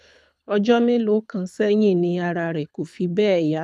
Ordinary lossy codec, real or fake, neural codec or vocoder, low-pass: none; fake; codec, 24 kHz, 6 kbps, HILCodec; none